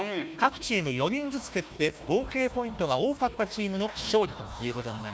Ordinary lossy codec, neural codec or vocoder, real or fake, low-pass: none; codec, 16 kHz, 1 kbps, FunCodec, trained on Chinese and English, 50 frames a second; fake; none